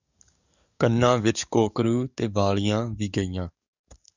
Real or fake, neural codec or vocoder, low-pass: fake; codec, 44.1 kHz, 7.8 kbps, DAC; 7.2 kHz